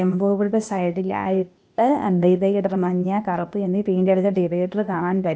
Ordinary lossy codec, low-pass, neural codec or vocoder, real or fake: none; none; codec, 16 kHz, 0.8 kbps, ZipCodec; fake